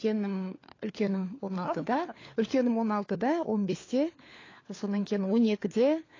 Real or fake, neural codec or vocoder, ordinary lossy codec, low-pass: fake; codec, 16 kHz, 4 kbps, FunCodec, trained on LibriTTS, 50 frames a second; AAC, 32 kbps; 7.2 kHz